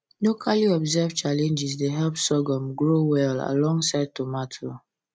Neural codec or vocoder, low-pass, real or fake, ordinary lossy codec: none; none; real; none